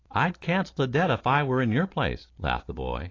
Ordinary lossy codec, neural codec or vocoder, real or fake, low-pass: AAC, 32 kbps; none; real; 7.2 kHz